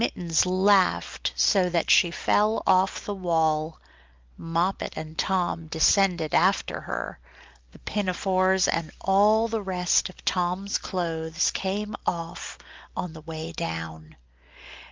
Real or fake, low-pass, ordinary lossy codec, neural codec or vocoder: real; 7.2 kHz; Opus, 24 kbps; none